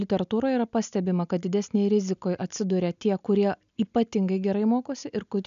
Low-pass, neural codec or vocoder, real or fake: 7.2 kHz; none; real